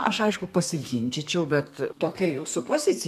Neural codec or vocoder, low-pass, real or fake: codec, 32 kHz, 1.9 kbps, SNAC; 14.4 kHz; fake